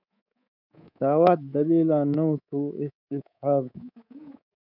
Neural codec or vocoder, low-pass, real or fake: codec, 24 kHz, 3.1 kbps, DualCodec; 5.4 kHz; fake